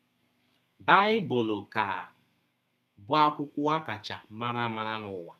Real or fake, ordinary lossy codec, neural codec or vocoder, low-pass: fake; none; codec, 32 kHz, 1.9 kbps, SNAC; 14.4 kHz